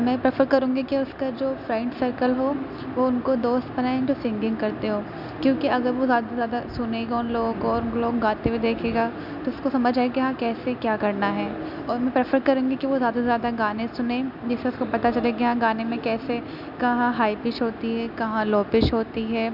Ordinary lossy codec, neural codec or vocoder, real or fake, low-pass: none; none; real; 5.4 kHz